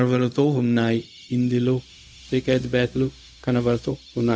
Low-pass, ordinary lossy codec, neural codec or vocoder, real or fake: none; none; codec, 16 kHz, 0.4 kbps, LongCat-Audio-Codec; fake